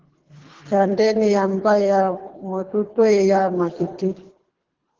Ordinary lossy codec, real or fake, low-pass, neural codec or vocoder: Opus, 16 kbps; fake; 7.2 kHz; codec, 24 kHz, 3 kbps, HILCodec